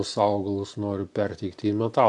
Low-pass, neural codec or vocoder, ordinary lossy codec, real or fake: 10.8 kHz; none; AAC, 64 kbps; real